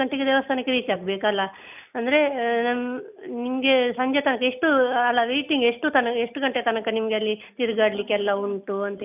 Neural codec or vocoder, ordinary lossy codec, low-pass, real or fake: none; none; 3.6 kHz; real